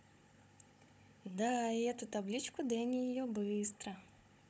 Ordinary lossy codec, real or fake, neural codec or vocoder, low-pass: none; fake; codec, 16 kHz, 16 kbps, FunCodec, trained on Chinese and English, 50 frames a second; none